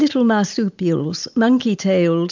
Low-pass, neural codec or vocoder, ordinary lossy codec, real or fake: 7.2 kHz; none; MP3, 64 kbps; real